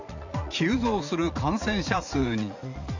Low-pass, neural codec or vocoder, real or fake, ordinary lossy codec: 7.2 kHz; vocoder, 44.1 kHz, 80 mel bands, Vocos; fake; none